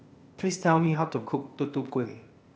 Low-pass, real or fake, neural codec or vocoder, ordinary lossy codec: none; fake; codec, 16 kHz, 0.8 kbps, ZipCodec; none